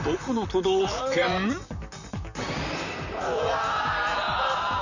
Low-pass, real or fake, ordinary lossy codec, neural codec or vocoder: 7.2 kHz; fake; none; vocoder, 44.1 kHz, 128 mel bands, Pupu-Vocoder